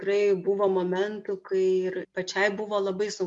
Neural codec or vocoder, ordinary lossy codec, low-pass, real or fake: none; MP3, 64 kbps; 10.8 kHz; real